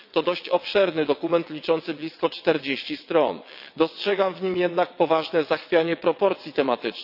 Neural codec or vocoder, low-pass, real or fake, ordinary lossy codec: vocoder, 22.05 kHz, 80 mel bands, WaveNeXt; 5.4 kHz; fake; none